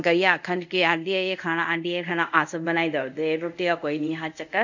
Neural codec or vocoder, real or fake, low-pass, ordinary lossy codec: codec, 24 kHz, 0.5 kbps, DualCodec; fake; 7.2 kHz; none